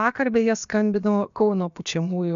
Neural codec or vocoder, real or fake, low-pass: codec, 16 kHz, about 1 kbps, DyCAST, with the encoder's durations; fake; 7.2 kHz